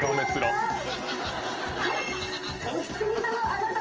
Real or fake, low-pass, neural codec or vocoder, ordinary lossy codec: real; 7.2 kHz; none; Opus, 24 kbps